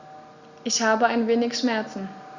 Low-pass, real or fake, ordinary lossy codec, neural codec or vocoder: 7.2 kHz; real; Opus, 64 kbps; none